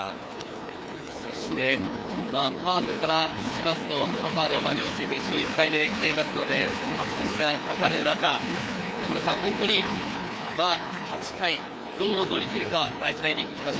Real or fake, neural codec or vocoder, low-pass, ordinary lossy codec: fake; codec, 16 kHz, 2 kbps, FreqCodec, larger model; none; none